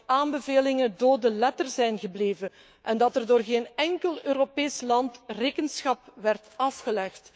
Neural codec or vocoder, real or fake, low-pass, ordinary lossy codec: codec, 16 kHz, 6 kbps, DAC; fake; none; none